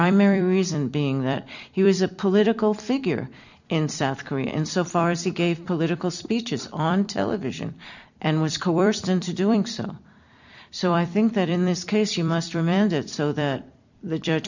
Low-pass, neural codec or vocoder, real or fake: 7.2 kHz; vocoder, 44.1 kHz, 128 mel bands every 256 samples, BigVGAN v2; fake